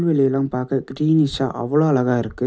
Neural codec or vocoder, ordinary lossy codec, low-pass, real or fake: none; none; none; real